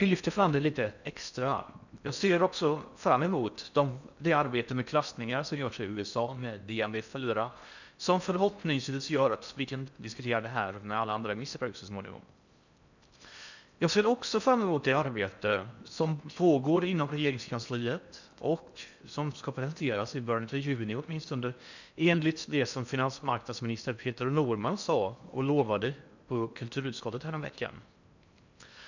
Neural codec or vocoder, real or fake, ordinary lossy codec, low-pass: codec, 16 kHz in and 24 kHz out, 0.6 kbps, FocalCodec, streaming, 4096 codes; fake; none; 7.2 kHz